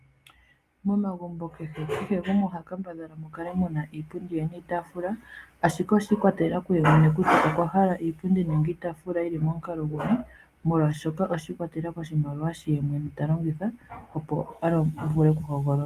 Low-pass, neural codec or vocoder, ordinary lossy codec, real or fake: 14.4 kHz; none; Opus, 32 kbps; real